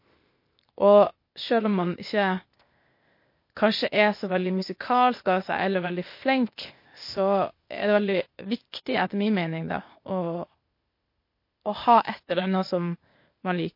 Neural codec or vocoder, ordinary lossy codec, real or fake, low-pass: codec, 16 kHz, 0.8 kbps, ZipCodec; MP3, 32 kbps; fake; 5.4 kHz